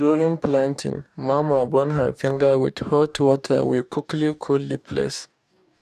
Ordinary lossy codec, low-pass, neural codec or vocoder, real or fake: none; 14.4 kHz; codec, 44.1 kHz, 2.6 kbps, DAC; fake